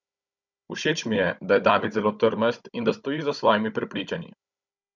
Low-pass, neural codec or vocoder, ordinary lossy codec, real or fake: 7.2 kHz; codec, 16 kHz, 16 kbps, FunCodec, trained on Chinese and English, 50 frames a second; none; fake